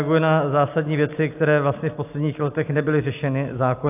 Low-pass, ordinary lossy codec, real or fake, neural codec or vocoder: 3.6 kHz; AAC, 32 kbps; real; none